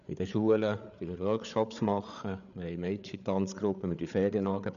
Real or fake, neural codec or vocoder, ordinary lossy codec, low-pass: fake; codec, 16 kHz, 8 kbps, FreqCodec, larger model; none; 7.2 kHz